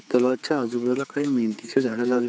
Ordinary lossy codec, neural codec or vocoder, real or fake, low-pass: none; codec, 16 kHz, 4 kbps, X-Codec, HuBERT features, trained on general audio; fake; none